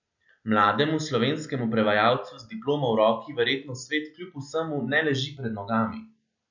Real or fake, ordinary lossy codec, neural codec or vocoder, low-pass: real; none; none; 7.2 kHz